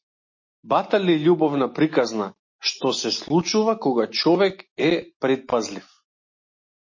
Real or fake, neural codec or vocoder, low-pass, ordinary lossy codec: real; none; 7.2 kHz; MP3, 32 kbps